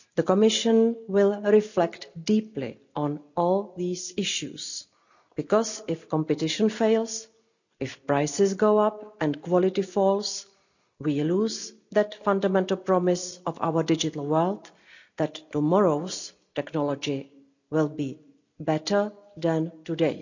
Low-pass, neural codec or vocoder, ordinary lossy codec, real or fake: 7.2 kHz; none; none; real